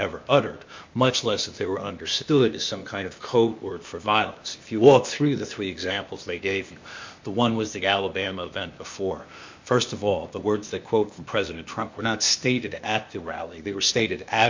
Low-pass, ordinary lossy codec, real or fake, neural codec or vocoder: 7.2 kHz; MP3, 48 kbps; fake; codec, 16 kHz, 0.8 kbps, ZipCodec